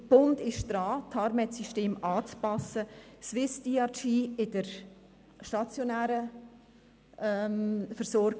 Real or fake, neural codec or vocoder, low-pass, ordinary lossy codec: real; none; none; none